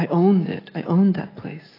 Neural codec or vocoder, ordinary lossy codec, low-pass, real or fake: none; AAC, 24 kbps; 5.4 kHz; real